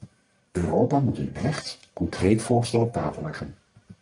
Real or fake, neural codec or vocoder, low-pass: fake; codec, 44.1 kHz, 1.7 kbps, Pupu-Codec; 10.8 kHz